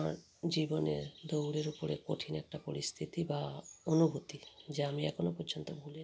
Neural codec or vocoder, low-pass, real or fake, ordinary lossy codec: none; none; real; none